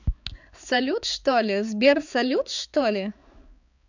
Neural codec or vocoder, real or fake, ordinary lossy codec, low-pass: codec, 16 kHz, 4 kbps, X-Codec, HuBERT features, trained on balanced general audio; fake; none; 7.2 kHz